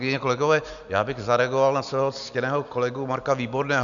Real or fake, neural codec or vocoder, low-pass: real; none; 7.2 kHz